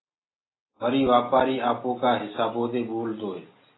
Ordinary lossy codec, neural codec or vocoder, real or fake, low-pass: AAC, 16 kbps; none; real; 7.2 kHz